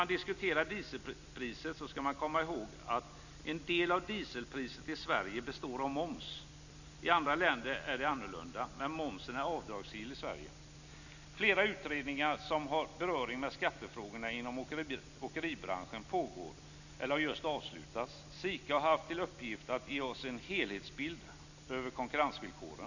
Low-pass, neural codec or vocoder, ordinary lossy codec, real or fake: 7.2 kHz; none; none; real